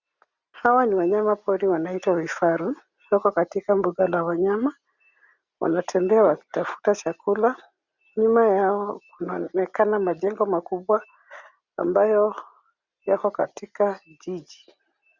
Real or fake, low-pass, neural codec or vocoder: real; 7.2 kHz; none